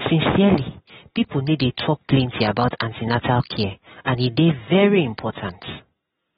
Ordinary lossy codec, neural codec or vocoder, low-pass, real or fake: AAC, 16 kbps; none; 14.4 kHz; real